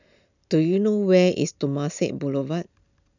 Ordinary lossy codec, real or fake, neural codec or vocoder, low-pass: none; real; none; 7.2 kHz